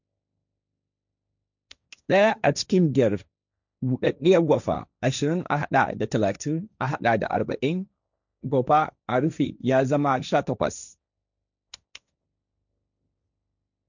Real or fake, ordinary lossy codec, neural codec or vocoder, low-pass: fake; none; codec, 16 kHz, 1.1 kbps, Voila-Tokenizer; 7.2 kHz